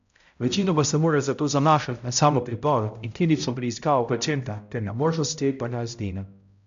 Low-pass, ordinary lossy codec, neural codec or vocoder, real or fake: 7.2 kHz; MP3, 64 kbps; codec, 16 kHz, 0.5 kbps, X-Codec, HuBERT features, trained on balanced general audio; fake